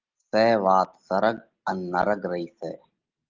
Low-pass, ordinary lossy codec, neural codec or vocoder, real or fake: 7.2 kHz; Opus, 32 kbps; none; real